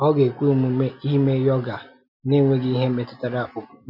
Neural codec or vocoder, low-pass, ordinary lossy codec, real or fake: none; 5.4 kHz; none; real